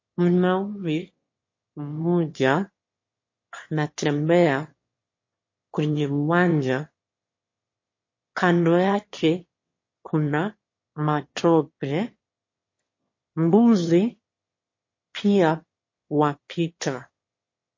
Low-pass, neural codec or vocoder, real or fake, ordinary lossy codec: 7.2 kHz; autoencoder, 22.05 kHz, a latent of 192 numbers a frame, VITS, trained on one speaker; fake; MP3, 32 kbps